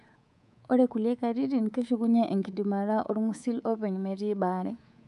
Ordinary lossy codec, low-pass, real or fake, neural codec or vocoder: none; 10.8 kHz; fake; codec, 24 kHz, 3.1 kbps, DualCodec